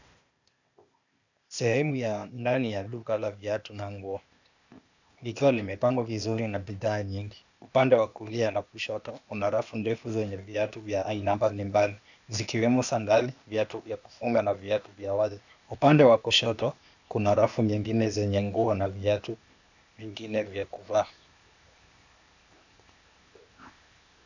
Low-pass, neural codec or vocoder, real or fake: 7.2 kHz; codec, 16 kHz, 0.8 kbps, ZipCodec; fake